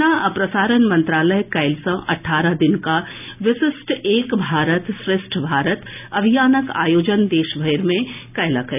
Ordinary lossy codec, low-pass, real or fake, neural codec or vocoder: none; 3.6 kHz; real; none